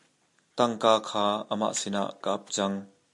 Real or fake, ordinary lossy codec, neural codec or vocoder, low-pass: real; MP3, 64 kbps; none; 10.8 kHz